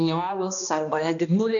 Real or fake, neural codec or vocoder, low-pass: fake; codec, 16 kHz, 1 kbps, X-Codec, HuBERT features, trained on balanced general audio; 7.2 kHz